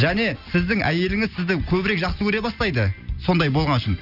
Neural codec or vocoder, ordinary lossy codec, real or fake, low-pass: none; none; real; 5.4 kHz